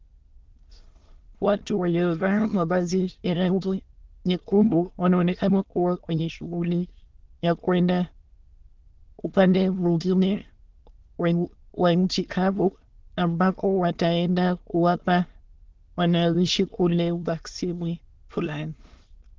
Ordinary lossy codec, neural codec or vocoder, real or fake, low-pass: Opus, 16 kbps; autoencoder, 22.05 kHz, a latent of 192 numbers a frame, VITS, trained on many speakers; fake; 7.2 kHz